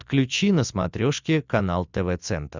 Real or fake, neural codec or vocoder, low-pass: real; none; 7.2 kHz